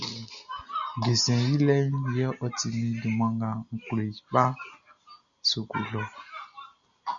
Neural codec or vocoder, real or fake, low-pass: none; real; 7.2 kHz